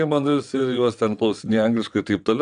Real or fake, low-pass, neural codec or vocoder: fake; 9.9 kHz; vocoder, 22.05 kHz, 80 mel bands, WaveNeXt